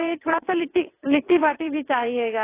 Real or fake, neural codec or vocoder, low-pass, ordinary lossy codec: fake; vocoder, 22.05 kHz, 80 mel bands, WaveNeXt; 3.6 kHz; AAC, 24 kbps